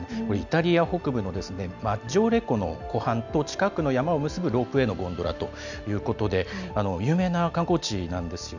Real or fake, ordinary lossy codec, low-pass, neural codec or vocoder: real; none; 7.2 kHz; none